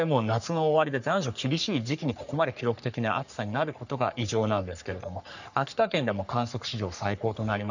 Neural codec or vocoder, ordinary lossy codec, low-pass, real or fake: codec, 44.1 kHz, 3.4 kbps, Pupu-Codec; none; 7.2 kHz; fake